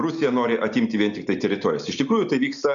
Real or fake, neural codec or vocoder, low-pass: real; none; 7.2 kHz